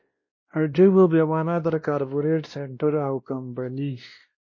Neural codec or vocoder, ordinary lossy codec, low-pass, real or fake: codec, 16 kHz, 1 kbps, X-Codec, WavLM features, trained on Multilingual LibriSpeech; MP3, 32 kbps; 7.2 kHz; fake